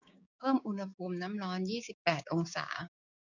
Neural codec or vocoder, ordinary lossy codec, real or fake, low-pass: codec, 16 kHz, 6 kbps, DAC; none; fake; 7.2 kHz